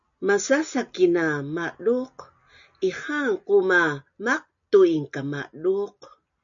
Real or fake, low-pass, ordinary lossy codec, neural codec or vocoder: real; 7.2 kHz; MP3, 64 kbps; none